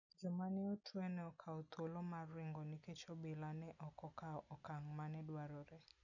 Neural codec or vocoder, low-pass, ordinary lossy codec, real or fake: none; 7.2 kHz; none; real